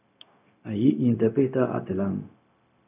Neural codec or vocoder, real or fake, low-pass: codec, 16 kHz, 0.4 kbps, LongCat-Audio-Codec; fake; 3.6 kHz